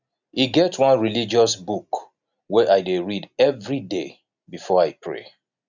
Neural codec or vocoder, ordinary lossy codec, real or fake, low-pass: none; none; real; 7.2 kHz